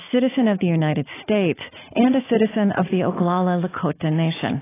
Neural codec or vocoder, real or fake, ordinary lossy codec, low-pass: none; real; AAC, 16 kbps; 3.6 kHz